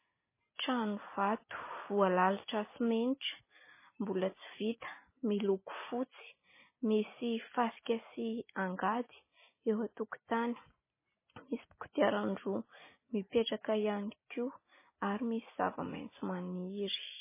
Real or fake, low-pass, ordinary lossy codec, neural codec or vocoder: real; 3.6 kHz; MP3, 16 kbps; none